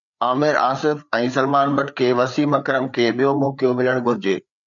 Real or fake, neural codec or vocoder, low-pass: fake; codec, 16 kHz, 4 kbps, FreqCodec, larger model; 7.2 kHz